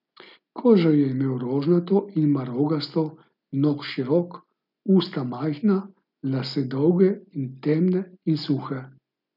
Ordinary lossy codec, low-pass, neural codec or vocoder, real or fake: none; 5.4 kHz; none; real